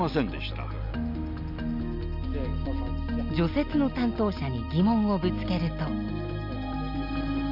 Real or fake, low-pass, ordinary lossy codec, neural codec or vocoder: real; 5.4 kHz; none; none